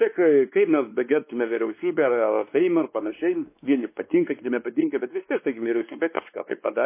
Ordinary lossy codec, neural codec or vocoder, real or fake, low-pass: MP3, 24 kbps; codec, 16 kHz, 2 kbps, X-Codec, WavLM features, trained on Multilingual LibriSpeech; fake; 3.6 kHz